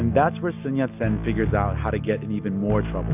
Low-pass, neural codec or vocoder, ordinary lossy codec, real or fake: 3.6 kHz; none; AAC, 32 kbps; real